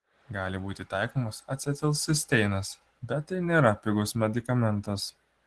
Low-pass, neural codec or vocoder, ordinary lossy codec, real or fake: 10.8 kHz; none; Opus, 16 kbps; real